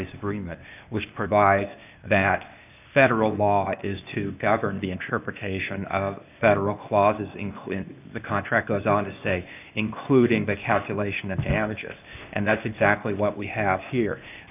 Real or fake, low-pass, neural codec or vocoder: fake; 3.6 kHz; codec, 16 kHz, 0.8 kbps, ZipCodec